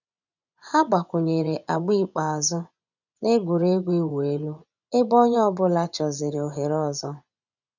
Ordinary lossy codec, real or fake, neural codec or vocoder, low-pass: none; fake; vocoder, 24 kHz, 100 mel bands, Vocos; 7.2 kHz